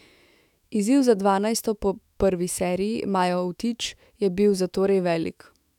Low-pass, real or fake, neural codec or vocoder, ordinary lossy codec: 19.8 kHz; fake; autoencoder, 48 kHz, 128 numbers a frame, DAC-VAE, trained on Japanese speech; none